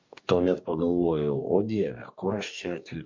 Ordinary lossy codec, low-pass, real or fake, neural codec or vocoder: MP3, 48 kbps; 7.2 kHz; fake; codec, 44.1 kHz, 2.6 kbps, DAC